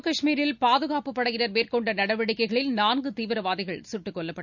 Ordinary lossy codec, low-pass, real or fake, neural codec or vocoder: none; 7.2 kHz; real; none